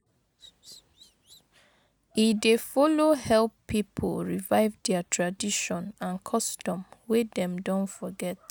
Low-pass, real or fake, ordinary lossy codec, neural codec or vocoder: none; real; none; none